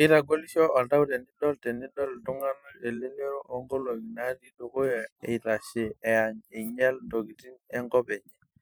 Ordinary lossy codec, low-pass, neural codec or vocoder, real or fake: none; none; vocoder, 44.1 kHz, 128 mel bands every 512 samples, BigVGAN v2; fake